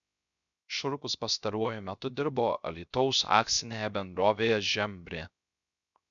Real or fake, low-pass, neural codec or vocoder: fake; 7.2 kHz; codec, 16 kHz, 0.3 kbps, FocalCodec